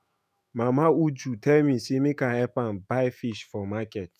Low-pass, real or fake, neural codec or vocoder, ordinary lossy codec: 14.4 kHz; fake; autoencoder, 48 kHz, 128 numbers a frame, DAC-VAE, trained on Japanese speech; none